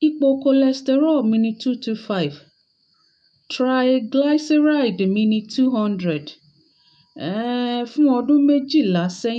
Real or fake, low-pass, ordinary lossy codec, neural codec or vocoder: fake; 9.9 kHz; none; autoencoder, 48 kHz, 128 numbers a frame, DAC-VAE, trained on Japanese speech